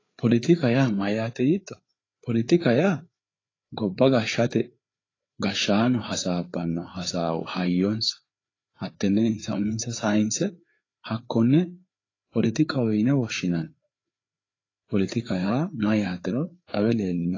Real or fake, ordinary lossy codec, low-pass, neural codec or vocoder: fake; AAC, 32 kbps; 7.2 kHz; codec, 16 kHz, 4 kbps, FreqCodec, larger model